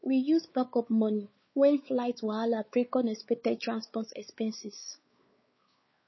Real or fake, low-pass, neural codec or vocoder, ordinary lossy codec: fake; 7.2 kHz; codec, 16 kHz, 4 kbps, X-Codec, WavLM features, trained on Multilingual LibriSpeech; MP3, 24 kbps